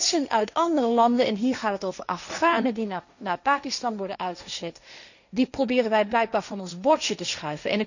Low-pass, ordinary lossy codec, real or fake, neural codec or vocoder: 7.2 kHz; none; fake; codec, 16 kHz, 1.1 kbps, Voila-Tokenizer